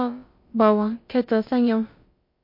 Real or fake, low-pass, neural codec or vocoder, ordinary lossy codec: fake; 5.4 kHz; codec, 16 kHz, about 1 kbps, DyCAST, with the encoder's durations; MP3, 32 kbps